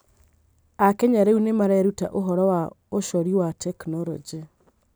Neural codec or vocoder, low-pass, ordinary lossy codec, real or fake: none; none; none; real